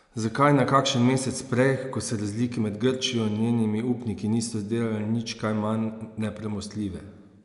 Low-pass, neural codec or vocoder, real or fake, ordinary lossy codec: 10.8 kHz; none; real; none